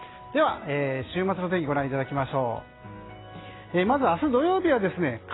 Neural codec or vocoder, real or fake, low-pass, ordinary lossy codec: none; real; 7.2 kHz; AAC, 16 kbps